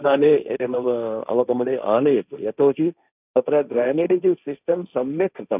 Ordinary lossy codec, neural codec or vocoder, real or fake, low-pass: none; codec, 16 kHz, 1.1 kbps, Voila-Tokenizer; fake; 3.6 kHz